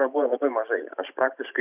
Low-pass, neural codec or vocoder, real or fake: 3.6 kHz; vocoder, 44.1 kHz, 128 mel bands every 512 samples, BigVGAN v2; fake